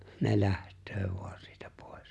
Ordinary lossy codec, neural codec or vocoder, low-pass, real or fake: none; none; none; real